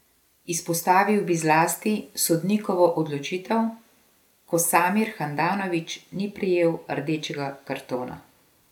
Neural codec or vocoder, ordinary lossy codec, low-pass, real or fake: none; none; 19.8 kHz; real